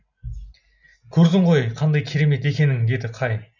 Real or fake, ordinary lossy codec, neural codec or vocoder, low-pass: real; none; none; 7.2 kHz